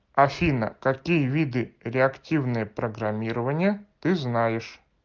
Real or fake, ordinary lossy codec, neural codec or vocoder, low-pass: real; Opus, 32 kbps; none; 7.2 kHz